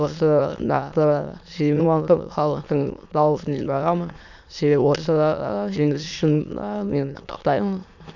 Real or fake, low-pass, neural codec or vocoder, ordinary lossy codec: fake; 7.2 kHz; autoencoder, 22.05 kHz, a latent of 192 numbers a frame, VITS, trained on many speakers; none